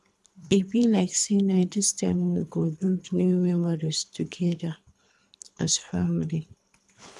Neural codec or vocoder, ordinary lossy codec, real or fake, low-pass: codec, 24 kHz, 3 kbps, HILCodec; none; fake; none